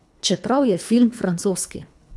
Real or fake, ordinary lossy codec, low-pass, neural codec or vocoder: fake; none; none; codec, 24 kHz, 3 kbps, HILCodec